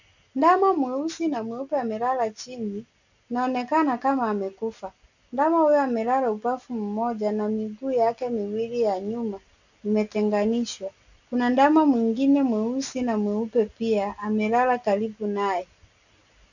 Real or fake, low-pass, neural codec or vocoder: real; 7.2 kHz; none